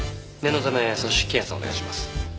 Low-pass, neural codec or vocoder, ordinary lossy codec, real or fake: none; none; none; real